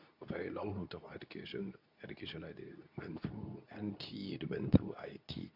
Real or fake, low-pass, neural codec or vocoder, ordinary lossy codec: fake; 5.4 kHz; codec, 24 kHz, 0.9 kbps, WavTokenizer, medium speech release version 2; none